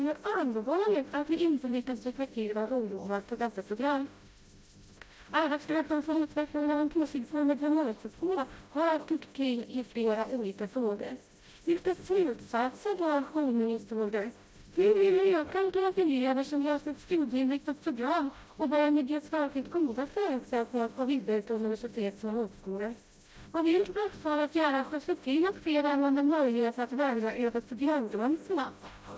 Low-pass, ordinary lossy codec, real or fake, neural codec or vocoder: none; none; fake; codec, 16 kHz, 0.5 kbps, FreqCodec, smaller model